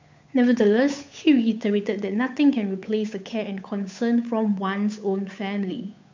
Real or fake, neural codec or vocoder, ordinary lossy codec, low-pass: fake; codec, 16 kHz, 8 kbps, FunCodec, trained on Chinese and English, 25 frames a second; MP3, 64 kbps; 7.2 kHz